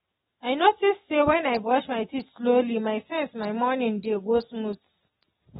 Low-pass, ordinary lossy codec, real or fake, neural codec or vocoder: 19.8 kHz; AAC, 16 kbps; real; none